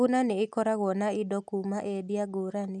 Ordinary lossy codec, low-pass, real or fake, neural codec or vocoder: none; none; real; none